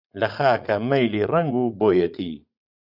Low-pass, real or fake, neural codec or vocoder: 5.4 kHz; fake; vocoder, 44.1 kHz, 80 mel bands, Vocos